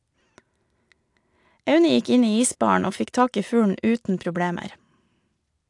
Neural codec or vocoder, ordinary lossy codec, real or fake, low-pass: none; AAC, 64 kbps; real; 10.8 kHz